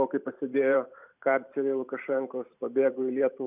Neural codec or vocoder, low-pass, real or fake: vocoder, 44.1 kHz, 128 mel bands every 512 samples, BigVGAN v2; 3.6 kHz; fake